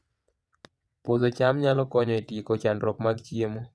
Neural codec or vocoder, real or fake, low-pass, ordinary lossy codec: vocoder, 22.05 kHz, 80 mel bands, WaveNeXt; fake; none; none